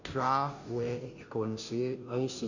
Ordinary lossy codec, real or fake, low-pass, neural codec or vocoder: none; fake; 7.2 kHz; codec, 16 kHz, 0.5 kbps, FunCodec, trained on Chinese and English, 25 frames a second